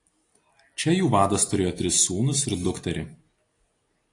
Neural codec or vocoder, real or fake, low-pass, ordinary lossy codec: none; real; 10.8 kHz; AAC, 48 kbps